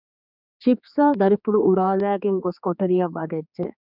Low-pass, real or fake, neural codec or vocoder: 5.4 kHz; fake; codec, 16 kHz, 4 kbps, X-Codec, HuBERT features, trained on general audio